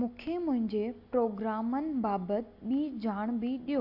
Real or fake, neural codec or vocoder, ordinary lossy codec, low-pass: real; none; AAC, 32 kbps; 5.4 kHz